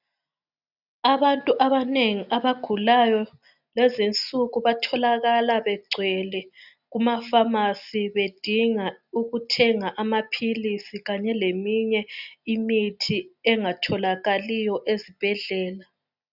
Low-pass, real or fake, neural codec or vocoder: 5.4 kHz; real; none